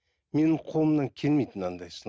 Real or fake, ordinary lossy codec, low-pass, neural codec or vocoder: real; none; none; none